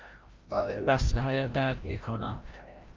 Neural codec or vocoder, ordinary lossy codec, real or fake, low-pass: codec, 16 kHz, 0.5 kbps, FreqCodec, larger model; Opus, 24 kbps; fake; 7.2 kHz